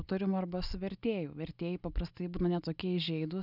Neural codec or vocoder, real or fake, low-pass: none; real; 5.4 kHz